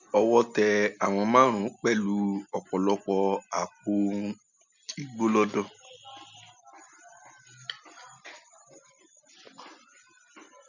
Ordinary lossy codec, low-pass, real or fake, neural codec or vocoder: none; 7.2 kHz; real; none